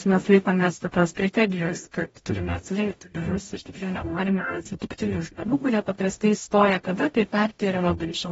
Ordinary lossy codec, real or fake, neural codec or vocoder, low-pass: AAC, 24 kbps; fake; codec, 44.1 kHz, 0.9 kbps, DAC; 19.8 kHz